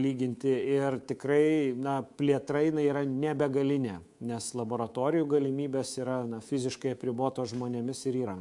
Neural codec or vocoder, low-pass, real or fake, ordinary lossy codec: codec, 24 kHz, 3.1 kbps, DualCodec; 10.8 kHz; fake; MP3, 64 kbps